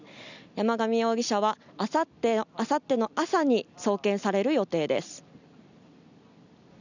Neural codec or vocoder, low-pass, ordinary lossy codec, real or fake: none; 7.2 kHz; none; real